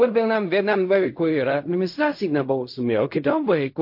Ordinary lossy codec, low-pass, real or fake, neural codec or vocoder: MP3, 32 kbps; 5.4 kHz; fake; codec, 16 kHz in and 24 kHz out, 0.4 kbps, LongCat-Audio-Codec, fine tuned four codebook decoder